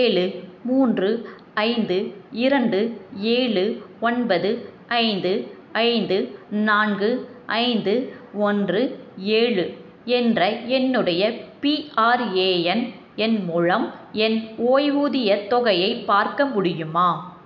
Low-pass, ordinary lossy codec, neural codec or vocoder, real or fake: none; none; none; real